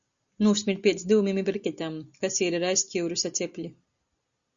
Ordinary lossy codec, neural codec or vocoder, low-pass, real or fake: Opus, 64 kbps; none; 7.2 kHz; real